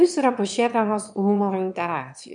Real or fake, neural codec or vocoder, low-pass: fake; autoencoder, 22.05 kHz, a latent of 192 numbers a frame, VITS, trained on one speaker; 9.9 kHz